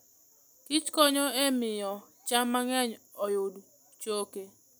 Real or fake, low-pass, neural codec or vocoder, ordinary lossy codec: real; none; none; none